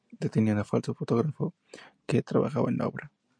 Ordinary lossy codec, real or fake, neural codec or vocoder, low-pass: MP3, 64 kbps; real; none; 9.9 kHz